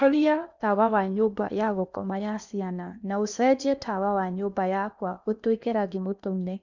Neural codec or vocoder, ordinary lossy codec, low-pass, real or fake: codec, 16 kHz in and 24 kHz out, 0.8 kbps, FocalCodec, streaming, 65536 codes; none; 7.2 kHz; fake